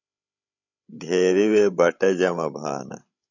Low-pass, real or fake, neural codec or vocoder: 7.2 kHz; fake; codec, 16 kHz, 16 kbps, FreqCodec, larger model